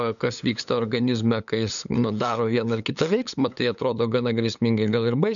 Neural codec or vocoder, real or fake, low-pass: codec, 16 kHz, 8 kbps, FunCodec, trained on LibriTTS, 25 frames a second; fake; 7.2 kHz